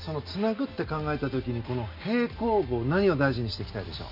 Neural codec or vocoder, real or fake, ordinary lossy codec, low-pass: none; real; none; 5.4 kHz